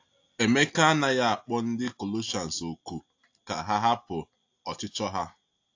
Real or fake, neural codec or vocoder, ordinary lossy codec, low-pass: real; none; AAC, 48 kbps; 7.2 kHz